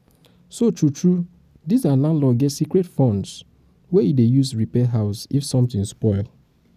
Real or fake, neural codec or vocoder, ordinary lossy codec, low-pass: real; none; none; 14.4 kHz